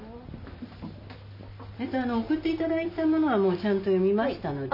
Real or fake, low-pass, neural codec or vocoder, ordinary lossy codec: real; 5.4 kHz; none; none